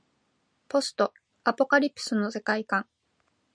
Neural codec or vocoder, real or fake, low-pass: none; real; 9.9 kHz